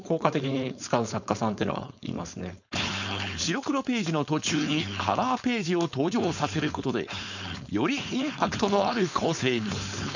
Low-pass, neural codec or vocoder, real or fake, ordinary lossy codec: 7.2 kHz; codec, 16 kHz, 4.8 kbps, FACodec; fake; none